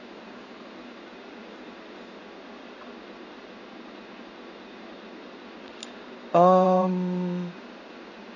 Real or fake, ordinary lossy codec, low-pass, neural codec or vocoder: fake; none; 7.2 kHz; codec, 16 kHz in and 24 kHz out, 1 kbps, XY-Tokenizer